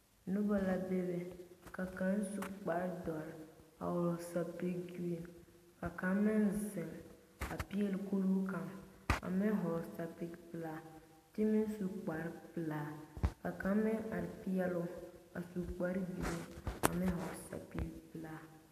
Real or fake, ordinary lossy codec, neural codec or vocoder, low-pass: real; AAC, 64 kbps; none; 14.4 kHz